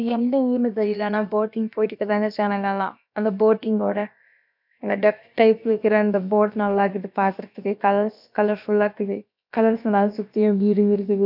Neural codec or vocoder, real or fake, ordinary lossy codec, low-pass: codec, 16 kHz, about 1 kbps, DyCAST, with the encoder's durations; fake; none; 5.4 kHz